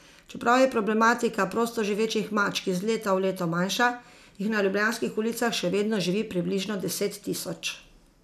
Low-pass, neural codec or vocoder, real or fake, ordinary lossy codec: 14.4 kHz; none; real; none